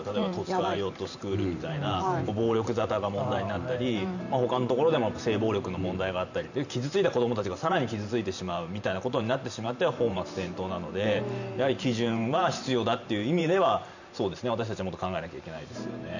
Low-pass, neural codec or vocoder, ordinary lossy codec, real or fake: 7.2 kHz; vocoder, 44.1 kHz, 128 mel bands every 512 samples, BigVGAN v2; none; fake